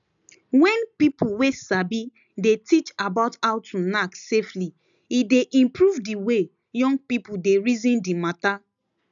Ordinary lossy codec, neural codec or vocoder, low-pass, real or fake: none; none; 7.2 kHz; real